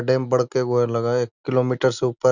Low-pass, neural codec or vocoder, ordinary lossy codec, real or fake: 7.2 kHz; none; none; real